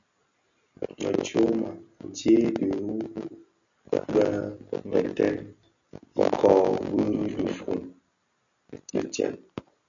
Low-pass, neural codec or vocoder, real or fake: 7.2 kHz; none; real